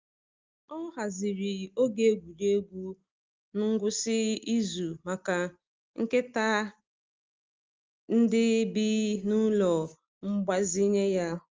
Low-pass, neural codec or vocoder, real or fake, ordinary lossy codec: 7.2 kHz; none; real; Opus, 32 kbps